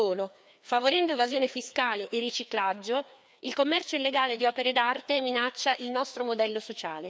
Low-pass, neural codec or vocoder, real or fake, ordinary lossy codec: none; codec, 16 kHz, 2 kbps, FreqCodec, larger model; fake; none